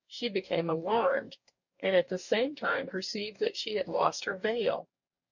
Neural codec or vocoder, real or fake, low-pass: codec, 44.1 kHz, 2.6 kbps, DAC; fake; 7.2 kHz